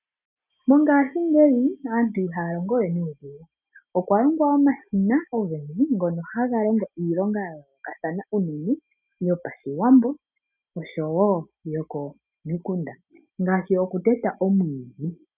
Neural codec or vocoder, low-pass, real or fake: none; 3.6 kHz; real